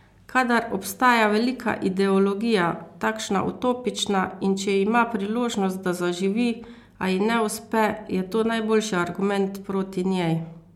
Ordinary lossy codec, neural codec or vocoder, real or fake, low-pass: MP3, 96 kbps; none; real; 19.8 kHz